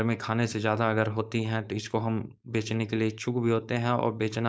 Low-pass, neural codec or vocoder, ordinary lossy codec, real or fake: none; codec, 16 kHz, 4.8 kbps, FACodec; none; fake